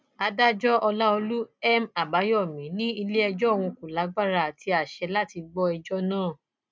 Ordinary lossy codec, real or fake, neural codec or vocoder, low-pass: none; real; none; none